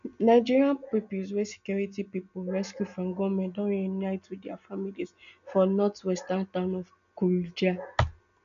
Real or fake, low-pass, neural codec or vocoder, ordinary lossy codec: real; 7.2 kHz; none; none